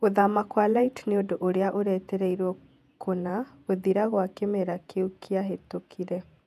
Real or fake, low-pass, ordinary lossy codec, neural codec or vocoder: fake; 14.4 kHz; none; vocoder, 48 kHz, 128 mel bands, Vocos